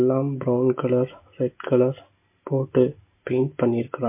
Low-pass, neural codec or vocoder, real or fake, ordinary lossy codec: 3.6 kHz; none; real; MP3, 24 kbps